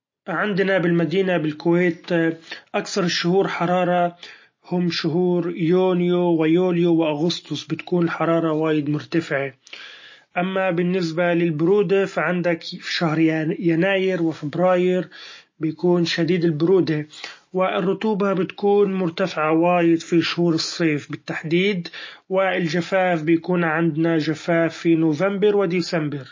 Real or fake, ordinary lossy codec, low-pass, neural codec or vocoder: real; MP3, 32 kbps; 7.2 kHz; none